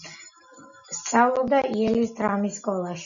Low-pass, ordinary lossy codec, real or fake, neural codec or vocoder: 7.2 kHz; MP3, 96 kbps; real; none